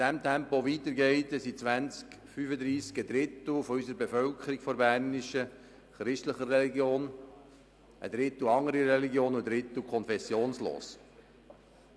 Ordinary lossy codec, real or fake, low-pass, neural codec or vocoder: none; real; none; none